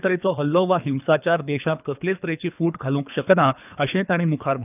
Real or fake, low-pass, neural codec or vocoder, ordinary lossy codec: fake; 3.6 kHz; codec, 24 kHz, 3 kbps, HILCodec; none